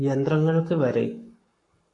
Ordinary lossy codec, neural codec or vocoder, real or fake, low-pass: AAC, 48 kbps; codec, 44.1 kHz, 7.8 kbps, Pupu-Codec; fake; 10.8 kHz